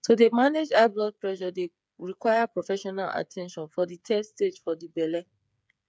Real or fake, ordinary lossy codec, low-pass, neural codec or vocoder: fake; none; none; codec, 16 kHz, 8 kbps, FreqCodec, smaller model